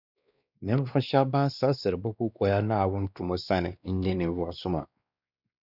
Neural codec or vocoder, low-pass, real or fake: codec, 16 kHz, 2 kbps, X-Codec, WavLM features, trained on Multilingual LibriSpeech; 5.4 kHz; fake